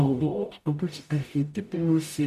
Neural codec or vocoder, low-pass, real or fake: codec, 44.1 kHz, 0.9 kbps, DAC; 14.4 kHz; fake